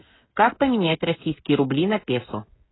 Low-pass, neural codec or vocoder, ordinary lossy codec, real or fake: 7.2 kHz; codec, 16 kHz, 16 kbps, FreqCodec, smaller model; AAC, 16 kbps; fake